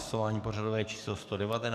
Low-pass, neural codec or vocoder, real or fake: 14.4 kHz; codec, 44.1 kHz, 7.8 kbps, DAC; fake